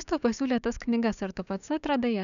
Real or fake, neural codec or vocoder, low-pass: fake; codec, 16 kHz, 6 kbps, DAC; 7.2 kHz